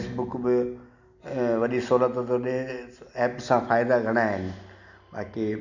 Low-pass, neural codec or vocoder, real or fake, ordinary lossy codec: 7.2 kHz; none; real; none